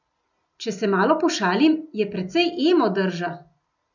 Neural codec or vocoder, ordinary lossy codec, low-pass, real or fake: none; none; 7.2 kHz; real